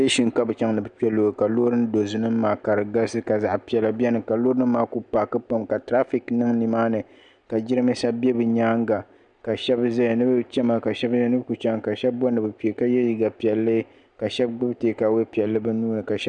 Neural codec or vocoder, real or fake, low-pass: none; real; 9.9 kHz